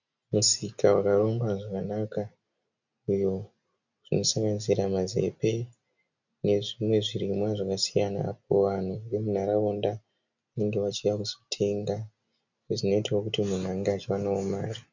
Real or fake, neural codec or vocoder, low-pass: real; none; 7.2 kHz